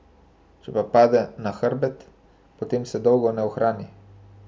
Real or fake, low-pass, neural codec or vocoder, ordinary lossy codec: real; none; none; none